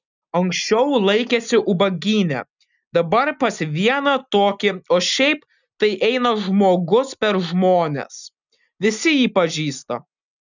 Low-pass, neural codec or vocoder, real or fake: 7.2 kHz; none; real